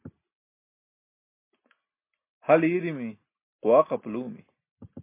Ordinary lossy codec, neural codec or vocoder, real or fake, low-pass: MP3, 24 kbps; none; real; 3.6 kHz